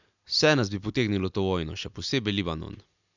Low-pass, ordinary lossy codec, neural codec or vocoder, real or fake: 7.2 kHz; none; none; real